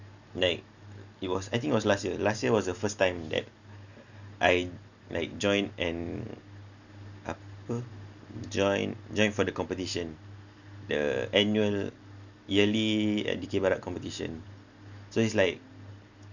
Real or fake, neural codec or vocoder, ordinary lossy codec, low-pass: real; none; none; 7.2 kHz